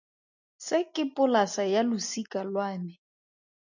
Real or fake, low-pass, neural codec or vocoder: real; 7.2 kHz; none